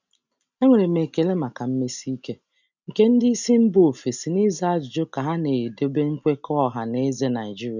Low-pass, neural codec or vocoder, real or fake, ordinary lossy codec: 7.2 kHz; none; real; none